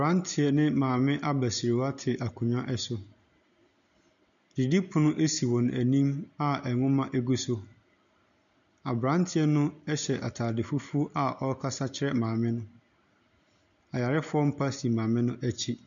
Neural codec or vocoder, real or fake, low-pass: none; real; 7.2 kHz